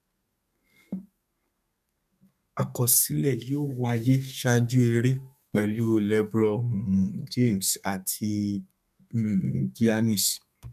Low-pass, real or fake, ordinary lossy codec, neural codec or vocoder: 14.4 kHz; fake; none; codec, 32 kHz, 1.9 kbps, SNAC